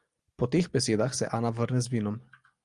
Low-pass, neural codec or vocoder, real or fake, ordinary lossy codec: 10.8 kHz; none; real; Opus, 32 kbps